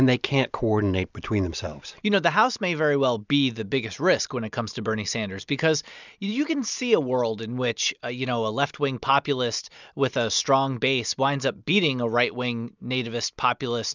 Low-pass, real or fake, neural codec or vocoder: 7.2 kHz; real; none